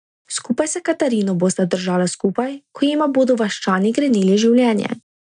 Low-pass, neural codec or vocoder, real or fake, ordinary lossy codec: 9.9 kHz; none; real; none